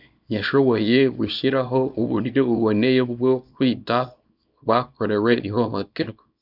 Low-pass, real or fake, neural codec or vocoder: 5.4 kHz; fake; codec, 24 kHz, 0.9 kbps, WavTokenizer, small release